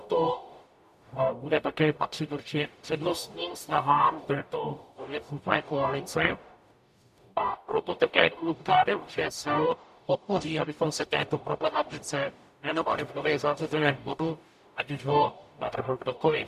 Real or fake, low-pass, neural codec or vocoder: fake; 14.4 kHz; codec, 44.1 kHz, 0.9 kbps, DAC